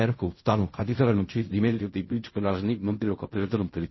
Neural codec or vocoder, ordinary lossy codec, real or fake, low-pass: codec, 16 kHz in and 24 kHz out, 0.4 kbps, LongCat-Audio-Codec, fine tuned four codebook decoder; MP3, 24 kbps; fake; 7.2 kHz